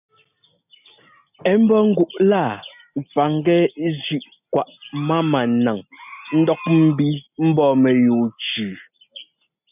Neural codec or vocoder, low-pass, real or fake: none; 3.6 kHz; real